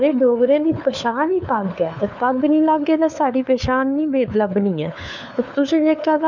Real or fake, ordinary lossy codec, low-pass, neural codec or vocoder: fake; none; 7.2 kHz; codec, 16 kHz, 4 kbps, FunCodec, trained on LibriTTS, 50 frames a second